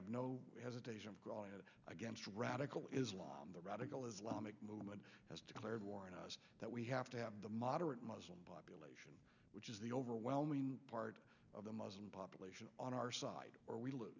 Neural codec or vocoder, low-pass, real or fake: none; 7.2 kHz; real